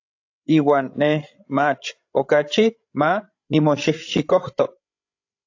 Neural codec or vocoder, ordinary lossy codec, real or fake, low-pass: codec, 16 kHz, 16 kbps, FreqCodec, larger model; AAC, 48 kbps; fake; 7.2 kHz